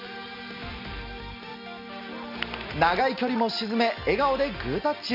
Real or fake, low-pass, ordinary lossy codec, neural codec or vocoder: real; 5.4 kHz; none; none